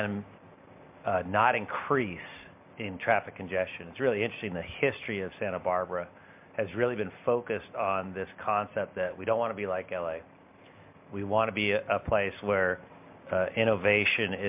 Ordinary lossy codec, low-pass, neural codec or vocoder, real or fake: MP3, 32 kbps; 3.6 kHz; none; real